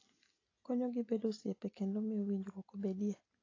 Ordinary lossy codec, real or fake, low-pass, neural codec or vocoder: AAC, 32 kbps; real; 7.2 kHz; none